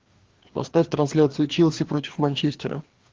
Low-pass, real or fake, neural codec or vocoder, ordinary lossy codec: 7.2 kHz; fake; codec, 16 kHz, 2 kbps, FreqCodec, larger model; Opus, 16 kbps